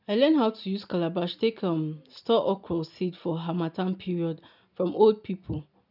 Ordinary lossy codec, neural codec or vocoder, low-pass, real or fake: none; none; 5.4 kHz; real